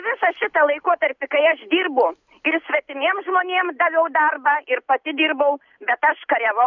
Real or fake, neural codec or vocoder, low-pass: real; none; 7.2 kHz